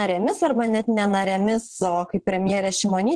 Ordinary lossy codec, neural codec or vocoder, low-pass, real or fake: Opus, 16 kbps; vocoder, 22.05 kHz, 80 mel bands, WaveNeXt; 9.9 kHz; fake